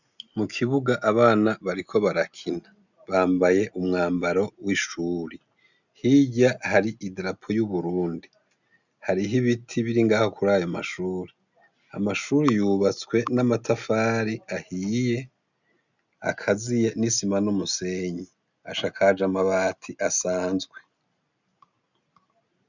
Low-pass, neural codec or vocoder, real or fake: 7.2 kHz; none; real